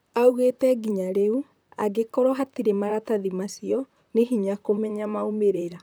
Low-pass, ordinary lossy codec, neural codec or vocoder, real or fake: none; none; vocoder, 44.1 kHz, 128 mel bands, Pupu-Vocoder; fake